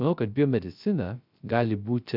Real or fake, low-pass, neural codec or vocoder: fake; 5.4 kHz; codec, 16 kHz, 0.3 kbps, FocalCodec